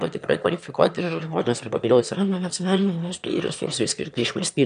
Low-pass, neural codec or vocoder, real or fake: 9.9 kHz; autoencoder, 22.05 kHz, a latent of 192 numbers a frame, VITS, trained on one speaker; fake